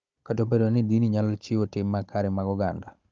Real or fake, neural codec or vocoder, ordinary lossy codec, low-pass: fake; codec, 16 kHz, 16 kbps, FunCodec, trained on Chinese and English, 50 frames a second; Opus, 24 kbps; 7.2 kHz